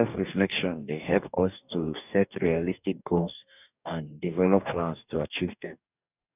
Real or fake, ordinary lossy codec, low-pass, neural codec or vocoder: fake; none; 3.6 kHz; codec, 44.1 kHz, 2.6 kbps, DAC